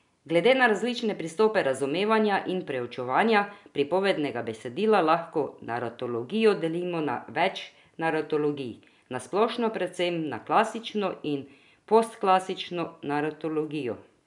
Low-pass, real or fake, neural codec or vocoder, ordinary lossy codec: 10.8 kHz; real; none; none